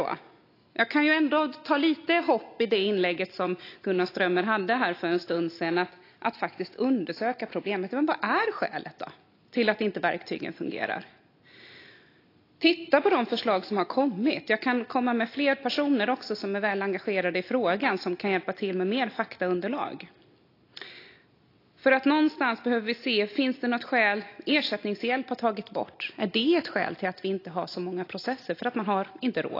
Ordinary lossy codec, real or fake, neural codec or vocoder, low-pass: AAC, 32 kbps; real; none; 5.4 kHz